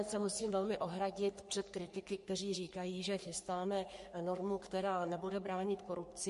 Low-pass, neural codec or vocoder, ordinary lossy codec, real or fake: 14.4 kHz; codec, 44.1 kHz, 3.4 kbps, Pupu-Codec; MP3, 48 kbps; fake